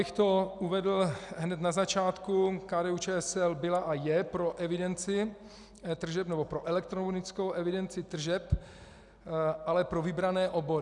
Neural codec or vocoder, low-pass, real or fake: none; 10.8 kHz; real